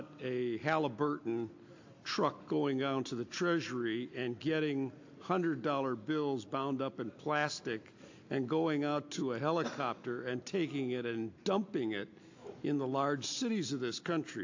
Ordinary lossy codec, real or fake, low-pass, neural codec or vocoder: AAC, 48 kbps; real; 7.2 kHz; none